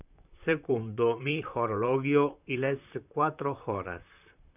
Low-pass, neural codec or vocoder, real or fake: 3.6 kHz; vocoder, 44.1 kHz, 128 mel bands, Pupu-Vocoder; fake